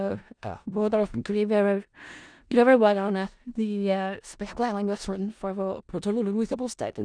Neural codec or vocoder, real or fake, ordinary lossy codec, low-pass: codec, 16 kHz in and 24 kHz out, 0.4 kbps, LongCat-Audio-Codec, four codebook decoder; fake; none; 9.9 kHz